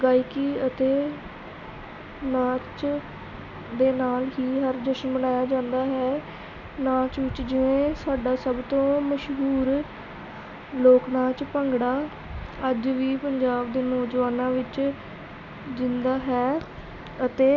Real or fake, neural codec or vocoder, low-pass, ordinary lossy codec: real; none; 7.2 kHz; none